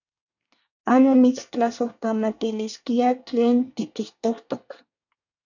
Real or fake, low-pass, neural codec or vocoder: fake; 7.2 kHz; codec, 24 kHz, 1 kbps, SNAC